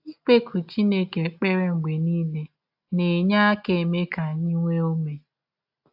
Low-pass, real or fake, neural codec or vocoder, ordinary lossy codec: 5.4 kHz; real; none; AAC, 48 kbps